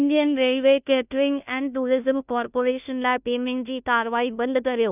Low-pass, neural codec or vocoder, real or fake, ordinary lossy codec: 3.6 kHz; codec, 16 kHz, 0.5 kbps, FunCodec, trained on Chinese and English, 25 frames a second; fake; none